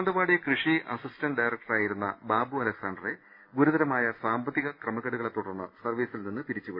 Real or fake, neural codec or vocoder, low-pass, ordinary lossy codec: real; none; 5.4 kHz; none